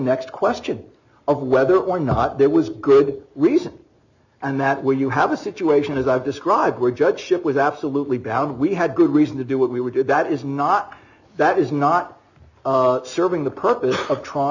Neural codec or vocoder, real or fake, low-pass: none; real; 7.2 kHz